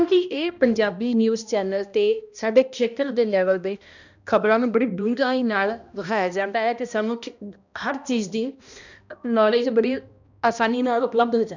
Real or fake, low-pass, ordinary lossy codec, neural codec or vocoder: fake; 7.2 kHz; none; codec, 16 kHz, 1 kbps, X-Codec, HuBERT features, trained on balanced general audio